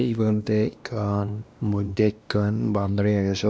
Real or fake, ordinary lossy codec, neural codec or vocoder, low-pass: fake; none; codec, 16 kHz, 1 kbps, X-Codec, WavLM features, trained on Multilingual LibriSpeech; none